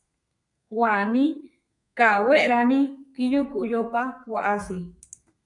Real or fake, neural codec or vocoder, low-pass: fake; codec, 32 kHz, 1.9 kbps, SNAC; 10.8 kHz